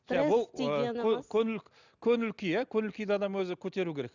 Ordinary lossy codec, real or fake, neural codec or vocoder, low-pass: none; real; none; 7.2 kHz